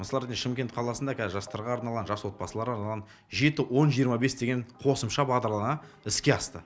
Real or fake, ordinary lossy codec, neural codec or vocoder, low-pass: real; none; none; none